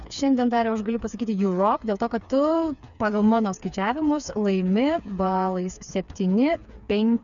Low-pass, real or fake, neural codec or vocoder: 7.2 kHz; fake; codec, 16 kHz, 4 kbps, FreqCodec, smaller model